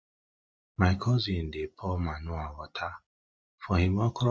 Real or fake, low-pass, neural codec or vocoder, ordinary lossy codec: real; none; none; none